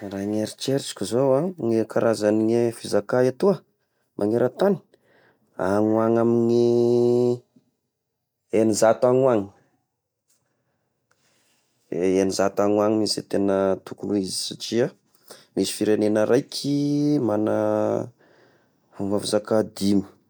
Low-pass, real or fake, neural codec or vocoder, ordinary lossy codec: none; real; none; none